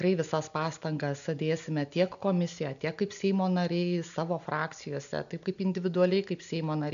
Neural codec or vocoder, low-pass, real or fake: none; 7.2 kHz; real